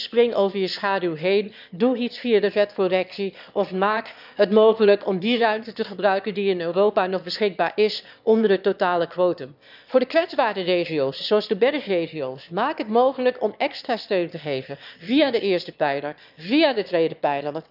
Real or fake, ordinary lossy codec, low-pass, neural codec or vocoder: fake; none; 5.4 kHz; autoencoder, 22.05 kHz, a latent of 192 numbers a frame, VITS, trained on one speaker